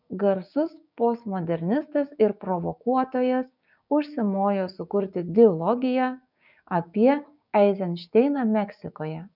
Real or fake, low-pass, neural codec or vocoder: fake; 5.4 kHz; codec, 16 kHz, 6 kbps, DAC